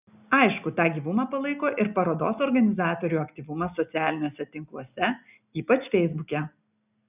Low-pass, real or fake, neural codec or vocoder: 3.6 kHz; real; none